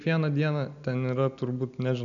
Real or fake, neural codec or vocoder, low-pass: real; none; 7.2 kHz